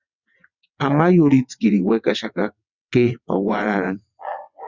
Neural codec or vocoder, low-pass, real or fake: vocoder, 22.05 kHz, 80 mel bands, WaveNeXt; 7.2 kHz; fake